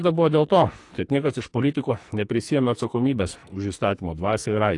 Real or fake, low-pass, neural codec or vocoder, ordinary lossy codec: fake; 10.8 kHz; codec, 44.1 kHz, 2.6 kbps, SNAC; AAC, 48 kbps